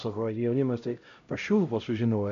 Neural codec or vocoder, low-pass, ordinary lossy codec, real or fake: codec, 16 kHz, 0.5 kbps, X-Codec, HuBERT features, trained on LibriSpeech; 7.2 kHz; AAC, 64 kbps; fake